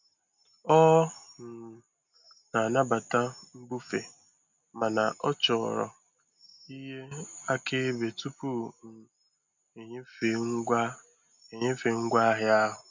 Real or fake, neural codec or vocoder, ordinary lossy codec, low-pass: real; none; none; 7.2 kHz